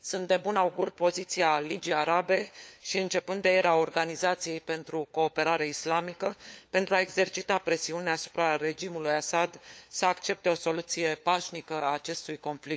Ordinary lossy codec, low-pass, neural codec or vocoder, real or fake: none; none; codec, 16 kHz, 4 kbps, FunCodec, trained on LibriTTS, 50 frames a second; fake